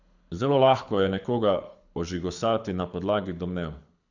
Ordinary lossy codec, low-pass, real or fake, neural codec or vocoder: none; 7.2 kHz; fake; codec, 24 kHz, 6 kbps, HILCodec